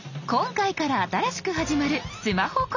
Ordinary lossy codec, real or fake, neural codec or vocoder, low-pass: none; real; none; 7.2 kHz